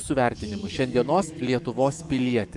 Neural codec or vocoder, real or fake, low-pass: codec, 44.1 kHz, 7.8 kbps, DAC; fake; 10.8 kHz